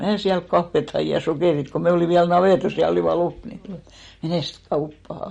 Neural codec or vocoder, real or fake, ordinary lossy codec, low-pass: none; real; MP3, 48 kbps; 19.8 kHz